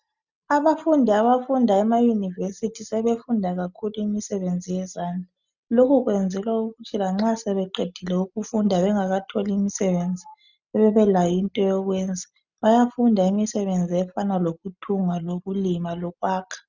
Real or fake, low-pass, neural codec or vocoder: real; 7.2 kHz; none